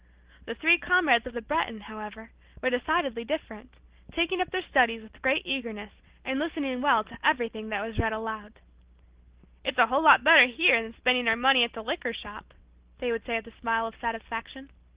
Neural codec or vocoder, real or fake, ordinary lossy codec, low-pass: none; real; Opus, 16 kbps; 3.6 kHz